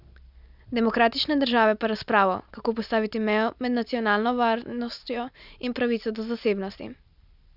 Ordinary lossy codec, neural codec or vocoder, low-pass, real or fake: none; none; 5.4 kHz; real